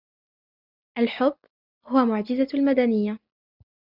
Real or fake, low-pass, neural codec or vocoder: real; 5.4 kHz; none